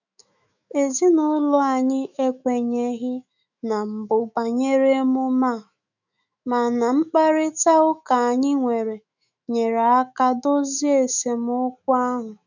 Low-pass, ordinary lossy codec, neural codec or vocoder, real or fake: 7.2 kHz; none; autoencoder, 48 kHz, 128 numbers a frame, DAC-VAE, trained on Japanese speech; fake